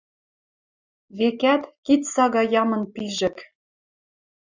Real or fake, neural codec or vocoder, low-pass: real; none; 7.2 kHz